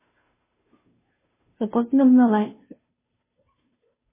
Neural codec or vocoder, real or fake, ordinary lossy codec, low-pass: codec, 16 kHz, 0.5 kbps, FunCodec, trained on Chinese and English, 25 frames a second; fake; MP3, 16 kbps; 3.6 kHz